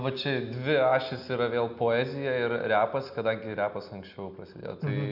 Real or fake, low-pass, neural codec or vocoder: real; 5.4 kHz; none